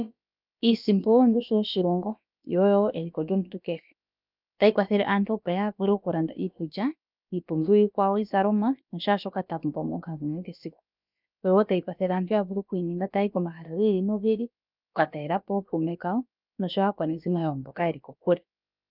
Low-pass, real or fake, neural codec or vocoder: 5.4 kHz; fake; codec, 16 kHz, about 1 kbps, DyCAST, with the encoder's durations